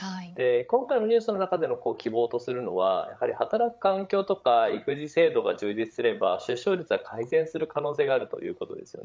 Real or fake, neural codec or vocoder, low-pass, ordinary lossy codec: fake; codec, 16 kHz, 8 kbps, FreqCodec, larger model; none; none